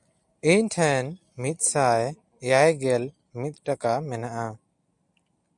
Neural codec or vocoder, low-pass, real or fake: none; 10.8 kHz; real